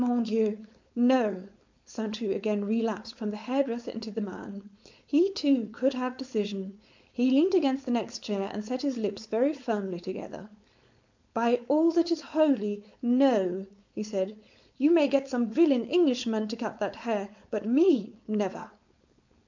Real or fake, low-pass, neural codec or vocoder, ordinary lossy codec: fake; 7.2 kHz; codec, 16 kHz, 4.8 kbps, FACodec; MP3, 64 kbps